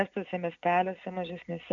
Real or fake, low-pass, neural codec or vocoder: real; 7.2 kHz; none